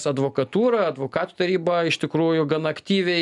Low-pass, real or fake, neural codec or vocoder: 10.8 kHz; real; none